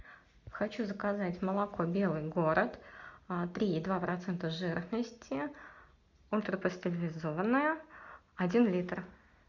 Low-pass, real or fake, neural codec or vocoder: 7.2 kHz; real; none